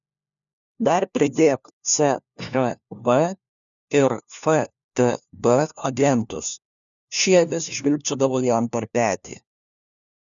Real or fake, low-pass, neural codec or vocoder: fake; 7.2 kHz; codec, 16 kHz, 1 kbps, FunCodec, trained on LibriTTS, 50 frames a second